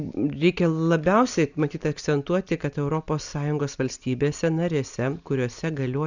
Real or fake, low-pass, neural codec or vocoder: real; 7.2 kHz; none